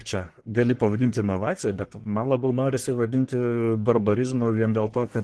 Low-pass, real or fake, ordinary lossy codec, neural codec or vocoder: 10.8 kHz; fake; Opus, 16 kbps; codec, 44.1 kHz, 1.7 kbps, Pupu-Codec